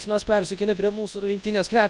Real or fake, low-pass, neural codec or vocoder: fake; 10.8 kHz; codec, 24 kHz, 0.9 kbps, WavTokenizer, large speech release